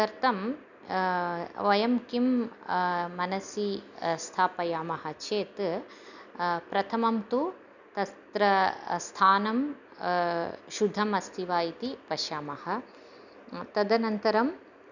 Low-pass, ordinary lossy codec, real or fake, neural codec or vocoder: 7.2 kHz; none; real; none